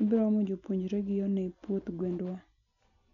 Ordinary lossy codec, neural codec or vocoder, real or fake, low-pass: none; none; real; 7.2 kHz